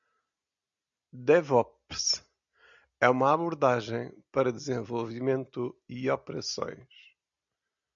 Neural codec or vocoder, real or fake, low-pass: none; real; 7.2 kHz